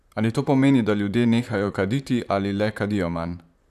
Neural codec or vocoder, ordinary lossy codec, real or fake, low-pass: vocoder, 44.1 kHz, 128 mel bands, Pupu-Vocoder; none; fake; 14.4 kHz